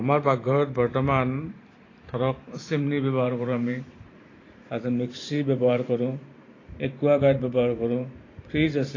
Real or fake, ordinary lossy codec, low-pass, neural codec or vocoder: fake; AAC, 32 kbps; 7.2 kHz; vocoder, 44.1 kHz, 128 mel bands every 512 samples, BigVGAN v2